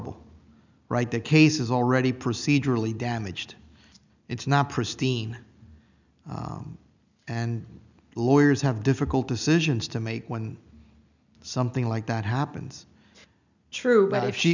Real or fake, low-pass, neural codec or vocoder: real; 7.2 kHz; none